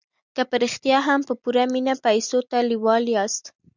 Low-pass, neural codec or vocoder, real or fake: 7.2 kHz; none; real